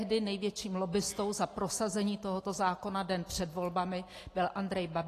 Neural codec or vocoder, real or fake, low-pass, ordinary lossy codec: none; real; 14.4 kHz; AAC, 48 kbps